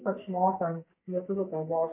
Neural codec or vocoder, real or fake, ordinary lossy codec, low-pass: codec, 44.1 kHz, 2.6 kbps, SNAC; fake; MP3, 24 kbps; 3.6 kHz